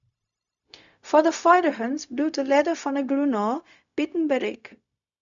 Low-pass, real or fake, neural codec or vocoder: 7.2 kHz; fake; codec, 16 kHz, 0.4 kbps, LongCat-Audio-Codec